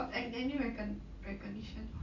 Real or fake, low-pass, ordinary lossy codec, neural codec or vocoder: real; 7.2 kHz; none; none